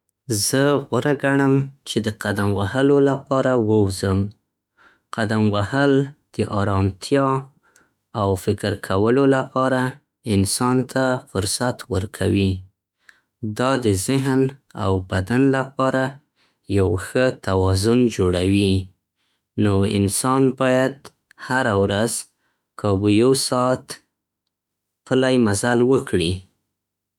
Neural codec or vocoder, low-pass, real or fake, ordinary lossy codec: autoencoder, 48 kHz, 32 numbers a frame, DAC-VAE, trained on Japanese speech; 19.8 kHz; fake; none